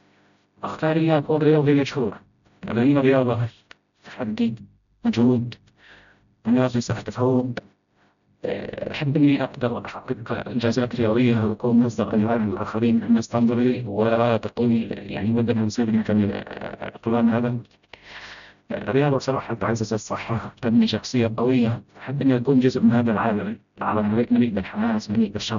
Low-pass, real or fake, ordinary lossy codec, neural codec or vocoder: 7.2 kHz; fake; none; codec, 16 kHz, 0.5 kbps, FreqCodec, smaller model